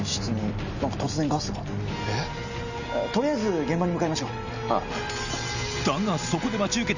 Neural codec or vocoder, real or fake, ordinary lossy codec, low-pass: none; real; none; 7.2 kHz